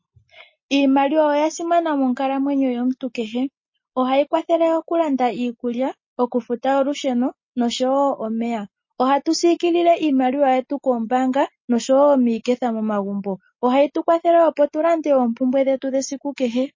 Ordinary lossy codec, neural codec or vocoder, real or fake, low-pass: MP3, 32 kbps; none; real; 7.2 kHz